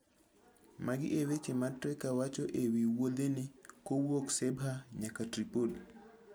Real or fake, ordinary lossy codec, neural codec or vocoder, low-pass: real; none; none; none